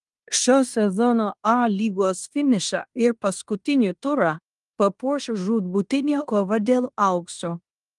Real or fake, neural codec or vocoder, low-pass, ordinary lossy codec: fake; codec, 16 kHz in and 24 kHz out, 0.9 kbps, LongCat-Audio-Codec, fine tuned four codebook decoder; 10.8 kHz; Opus, 32 kbps